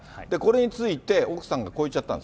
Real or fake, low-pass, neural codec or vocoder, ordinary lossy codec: real; none; none; none